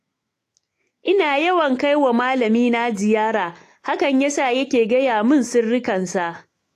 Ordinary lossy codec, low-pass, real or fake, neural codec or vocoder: AAC, 48 kbps; 14.4 kHz; fake; autoencoder, 48 kHz, 128 numbers a frame, DAC-VAE, trained on Japanese speech